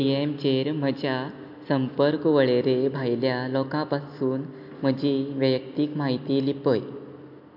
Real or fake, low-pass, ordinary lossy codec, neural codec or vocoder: real; 5.4 kHz; MP3, 48 kbps; none